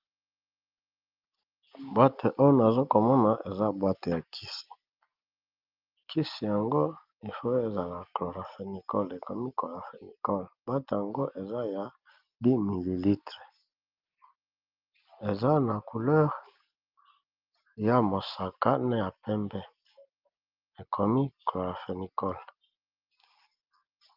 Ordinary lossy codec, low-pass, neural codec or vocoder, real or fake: Opus, 24 kbps; 5.4 kHz; none; real